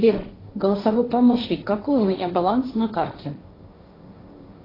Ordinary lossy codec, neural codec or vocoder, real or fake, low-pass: AAC, 24 kbps; codec, 16 kHz, 1.1 kbps, Voila-Tokenizer; fake; 5.4 kHz